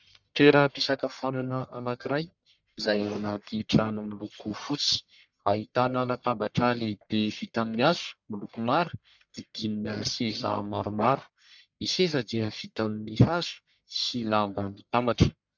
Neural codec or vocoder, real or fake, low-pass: codec, 44.1 kHz, 1.7 kbps, Pupu-Codec; fake; 7.2 kHz